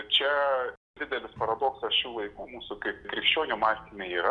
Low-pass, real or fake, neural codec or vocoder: 9.9 kHz; real; none